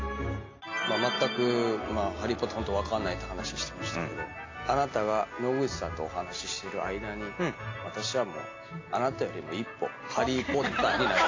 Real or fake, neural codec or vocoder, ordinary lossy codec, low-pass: real; none; AAC, 32 kbps; 7.2 kHz